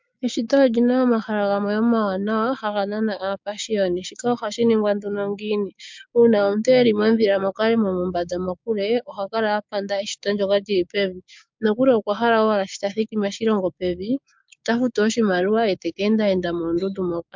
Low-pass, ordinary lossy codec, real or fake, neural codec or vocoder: 7.2 kHz; MP3, 64 kbps; fake; codec, 16 kHz, 6 kbps, DAC